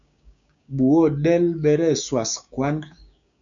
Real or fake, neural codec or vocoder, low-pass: fake; codec, 16 kHz, 6 kbps, DAC; 7.2 kHz